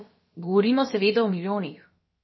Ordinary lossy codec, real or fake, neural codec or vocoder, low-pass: MP3, 24 kbps; fake; codec, 16 kHz, about 1 kbps, DyCAST, with the encoder's durations; 7.2 kHz